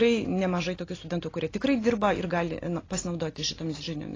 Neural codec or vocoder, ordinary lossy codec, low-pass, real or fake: none; AAC, 32 kbps; 7.2 kHz; real